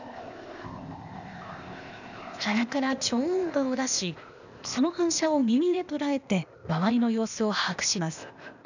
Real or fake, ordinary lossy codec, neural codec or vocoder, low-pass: fake; none; codec, 16 kHz, 0.8 kbps, ZipCodec; 7.2 kHz